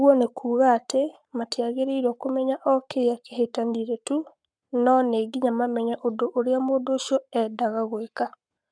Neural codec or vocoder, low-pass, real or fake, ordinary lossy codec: codec, 44.1 kHz, 7.8 kbps, Pupu-Codec; 9.9 kHz; fake; none